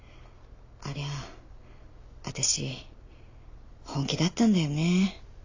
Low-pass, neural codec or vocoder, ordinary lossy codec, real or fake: 7.2 kHz; none; AAC, 48 kbps; real